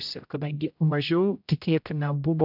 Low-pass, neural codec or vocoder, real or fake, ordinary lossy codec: 5.4 kHz; codec, 16 kHz, 0.5 kbps, X-Codec, HuBERT features, trained on general audio; fake; AAC, 48 kbps